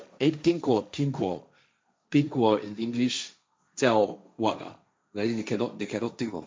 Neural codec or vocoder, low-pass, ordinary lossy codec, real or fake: codec, 16 kHz, 1.1 kbps, Voila-Tokenizer; none; none; fake